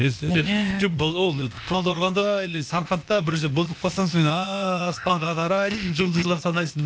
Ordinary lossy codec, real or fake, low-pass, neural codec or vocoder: none; fake; none; codec, 16 kHz, 0.8 kbps, ZipCodec